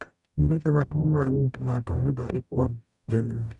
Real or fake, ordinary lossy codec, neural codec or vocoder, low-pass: fake; none; codec, 44.1 kHz, 0.9 kbps, DAC; 10.8 kHz